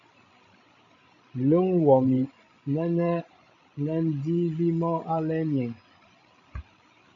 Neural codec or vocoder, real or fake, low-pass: codec, 16 kHz, 16 kbps, FreqCodec, larger model; fake; 7.2 kHz